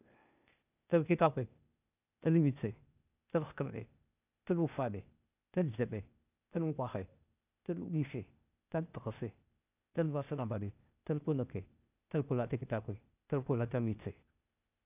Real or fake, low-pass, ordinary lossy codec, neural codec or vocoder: fake; 3.6 kHz; none; codec, 16 kHz, 0.8 kbps, ZipCodec